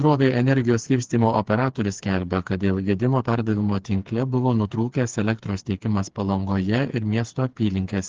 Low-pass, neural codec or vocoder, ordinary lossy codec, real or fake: 7.2 kHz; codec, 16 kHz, 4 kbps, FreqCodec, smaller model; Opus, 16 kbps; fake